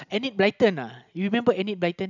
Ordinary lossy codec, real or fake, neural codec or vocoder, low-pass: none; real; none; 7.2 kHz